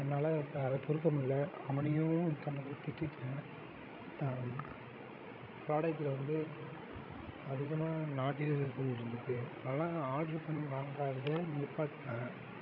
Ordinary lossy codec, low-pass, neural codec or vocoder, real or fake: none; 5.4 kHz; codec, 16 kHz, 16 kbps, FreqCodec, larger model; fake